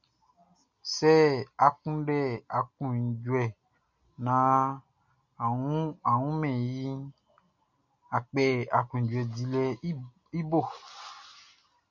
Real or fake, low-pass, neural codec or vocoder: real; 7.2 kHz; none